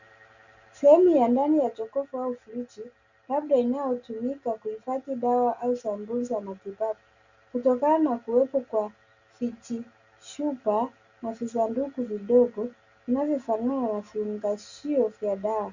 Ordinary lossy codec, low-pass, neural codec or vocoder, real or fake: Opus, 64 kbps; 7.2 kHz; none; real